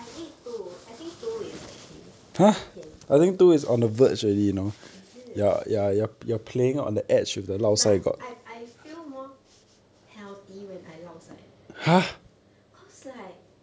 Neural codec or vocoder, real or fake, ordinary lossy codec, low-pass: none; real; none; none